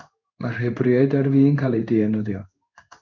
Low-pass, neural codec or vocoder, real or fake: 7.2 kHz; codec, 16 kHz in and 24 kHz out, 1 kbps, XY-Tokenizer; fake